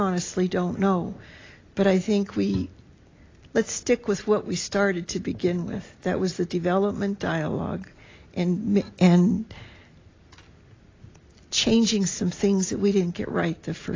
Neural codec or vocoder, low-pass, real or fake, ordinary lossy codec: none; 7.2 kHz; real; AAC, 32 kbps